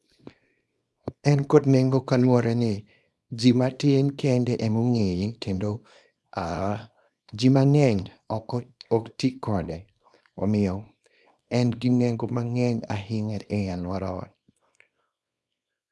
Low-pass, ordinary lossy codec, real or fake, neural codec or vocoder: none; none; fake; codec, 24 kHz, 0.9 kbps, WavTokenizer, small release